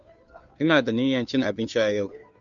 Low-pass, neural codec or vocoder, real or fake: 7.2 kHz; codec, 16 kHz, 2 kbps, FunCodec, trained on Chinese and English, 25 frames a second; fake